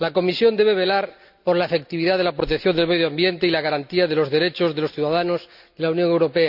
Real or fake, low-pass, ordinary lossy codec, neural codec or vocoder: real; 5.4 kHz; none; none